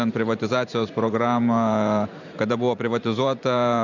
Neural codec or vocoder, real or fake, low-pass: vocoder, 44.1 kHz, 128 mel bands every 512 samples, BigVGAN v2; fake; 7.2 kHz